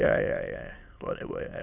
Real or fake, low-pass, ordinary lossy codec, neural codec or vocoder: fake; 3.6 kHz; none; autoencoder, 22.05 kHz, a latent of 192 numbers a frame, VITS, trained on many speakers